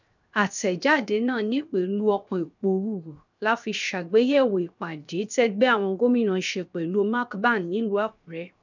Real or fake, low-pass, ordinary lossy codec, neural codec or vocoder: fake; 7.2 kHz; none; codec, 16 kHz, 0.7 kbps, FocalCodec